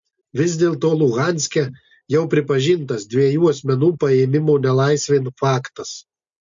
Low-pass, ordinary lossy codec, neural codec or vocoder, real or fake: 7.2 kHz; MP3, 48 kbps; none; real